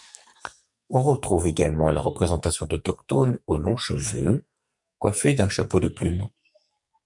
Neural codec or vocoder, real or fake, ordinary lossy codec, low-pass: codec, 44.1 kHz, 2.6 kbps, SNAC; fake; MP3, 64 kbps; 10.8 kHz